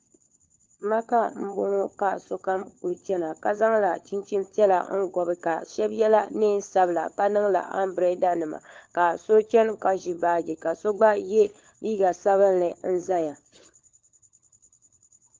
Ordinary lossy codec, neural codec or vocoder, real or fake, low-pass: Opus, 24 kbps; codec, 16 kHz, 4 kbps, FunCodec, trained on LibriTTS, 50 frames a second; fake; 7.2 kHz